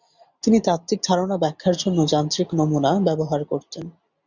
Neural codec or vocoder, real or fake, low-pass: none; real; 7.2 kHz